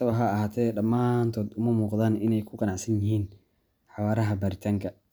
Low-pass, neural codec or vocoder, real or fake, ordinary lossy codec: none; none; real; none